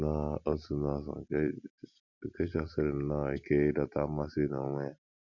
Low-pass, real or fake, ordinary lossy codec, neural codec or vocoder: 7.2 kHz; real; none; none